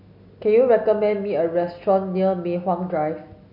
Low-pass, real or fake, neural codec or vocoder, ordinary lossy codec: 5.4 kHz; real; none; none